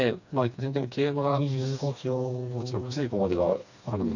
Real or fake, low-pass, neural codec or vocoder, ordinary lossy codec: fake; 7.2 kHz; codec, 16 kHz, 2 kbps, FreqCodec, smaller model; none